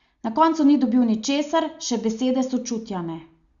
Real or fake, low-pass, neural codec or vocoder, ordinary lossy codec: real; 7.2 kHz; none; Opus, 64 kbps